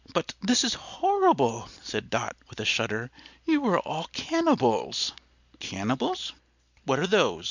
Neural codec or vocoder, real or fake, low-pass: none; real; 7.2 kHz